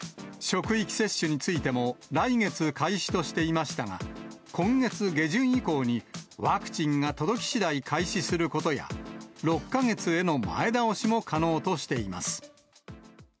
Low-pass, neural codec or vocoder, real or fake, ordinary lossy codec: none; none; real; none